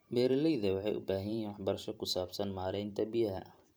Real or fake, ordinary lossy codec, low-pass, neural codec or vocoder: fake; none; none; vocoder, 44.1 kHz, 128 mel bands every 512 samples, BigVGAN v2